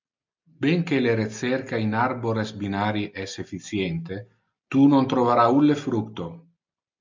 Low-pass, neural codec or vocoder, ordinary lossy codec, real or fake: 7.2 kHz; none; MP3, 64 kbps; real